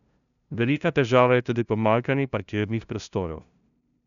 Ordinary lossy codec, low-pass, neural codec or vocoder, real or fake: none; 7.2 kHz; codec, 16 kHz, 0.5 kbps, FunCodec, trained on LibriTTS, 25 frames a second; fake